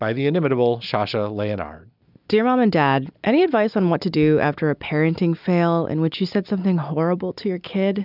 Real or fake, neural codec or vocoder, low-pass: real; none; 5.4 kHz